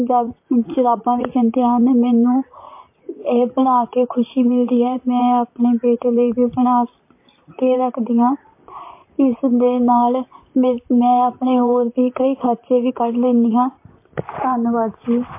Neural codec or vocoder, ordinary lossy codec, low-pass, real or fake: vocoder, 44.1 kHz, 128 mel bands, Pupu-Vocoder; MP3, 24 kbps; 3.6 kHz; fake